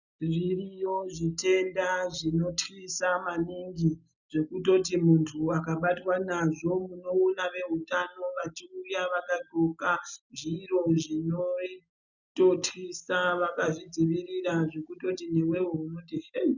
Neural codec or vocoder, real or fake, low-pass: none; real; 7.2 kHz